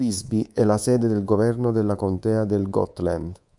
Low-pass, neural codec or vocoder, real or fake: 10.8 kHz; codec, 24 kHz, 3.1 kbps, DualCodec; fake